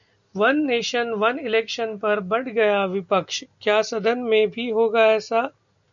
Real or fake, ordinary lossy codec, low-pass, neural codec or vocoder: real; MP3, 96 kbps; 7.2 kHz; none